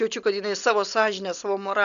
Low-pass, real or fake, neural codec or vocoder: 7.2 kHz; real; none